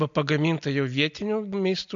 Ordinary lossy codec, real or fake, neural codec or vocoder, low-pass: MP3, 64 kbps; real; none; 7.2 kHz